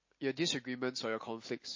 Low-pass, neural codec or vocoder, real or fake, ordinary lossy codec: 7.2 kHz; none; real; MP3, 32 kbps